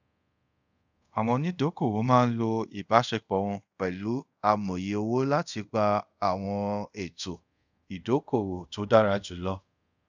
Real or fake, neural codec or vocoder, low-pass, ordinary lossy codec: fake; codec, 24 kHz, 0.5 kbps, DualCodec; 7.2 kHz; none